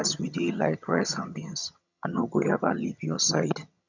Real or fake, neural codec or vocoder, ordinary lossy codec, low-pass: fake; vocoder, 22.05 kHz, 80 mel bands, HiFi-GAN; none; 7.2 kHz